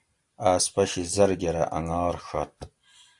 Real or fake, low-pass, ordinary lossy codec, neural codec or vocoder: real; 10.8 kHz; AAC, 64 kbps; none